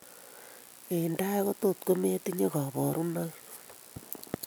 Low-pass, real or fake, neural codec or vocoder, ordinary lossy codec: none; real; none; none